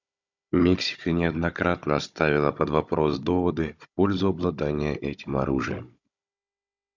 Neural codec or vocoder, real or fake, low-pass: codec, 16 kHz, 16 kbps, FunCodec, trained on Chinese and English, 50 frames a second; fake; 7.2 kHz